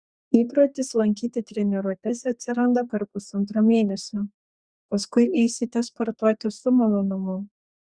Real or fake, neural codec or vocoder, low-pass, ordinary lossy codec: fake; codec, 32 kHz, 1.9 kbps, SNAC; 9.9 kHz; Opus, 64 kbps